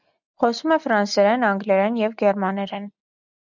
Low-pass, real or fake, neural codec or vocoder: 7.2 kHz; real; none